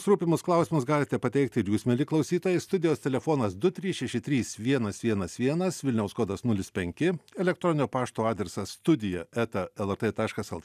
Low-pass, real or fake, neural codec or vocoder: 14.4 kHz; real; none